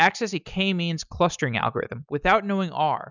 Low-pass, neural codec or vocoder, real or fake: 7.2 kHz; none; real